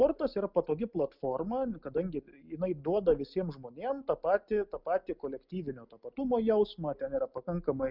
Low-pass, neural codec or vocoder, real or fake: 5.4 kHz; none; real